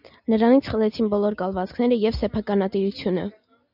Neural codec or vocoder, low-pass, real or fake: none; 5.4 kHz; real